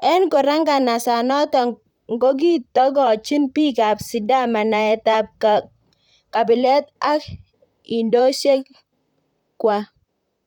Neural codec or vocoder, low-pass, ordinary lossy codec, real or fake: vocoder, 44.1 kHz, 128 mel bands, Pupu-Vocoder; 19.8 kHz; none; fake